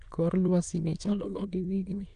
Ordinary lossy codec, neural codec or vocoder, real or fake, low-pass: none; autoencoder, 22.05 kHz, a latent of 192 numbers a frame, VITS, trained on many speakers; fake; 9.9 kHz